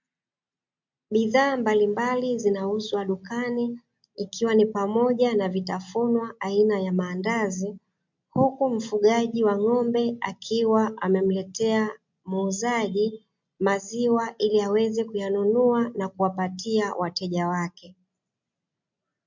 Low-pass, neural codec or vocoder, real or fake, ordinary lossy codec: 7.2 kHz; none; real; MP3, 64 kbps